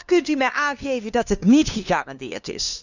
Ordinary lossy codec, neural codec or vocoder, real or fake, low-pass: none; codec, 16 kHz, 2 kbps, FunCodec, trained on LibriTTS, 25 frames a second; fake; 7.2 kHz